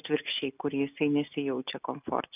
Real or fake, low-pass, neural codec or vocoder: real; 3.6 kHz; none